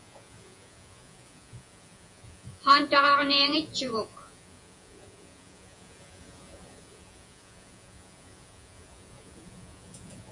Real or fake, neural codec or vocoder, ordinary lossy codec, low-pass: fake; vocoder, 48 kHz, 128 mel bands, Vocos; MP3, 48 kbps; 10.8 kHz